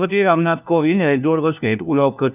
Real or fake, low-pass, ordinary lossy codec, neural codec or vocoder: fake; 3.6 kHz; none; codec, 16 kHz, 1 kbps, FunCodec, trained on LibriTTS, 50 frames a second